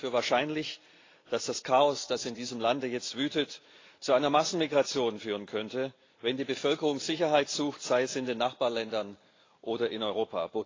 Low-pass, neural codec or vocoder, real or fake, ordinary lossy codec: 7.2 kHz; none; real; AAC, 32 kbps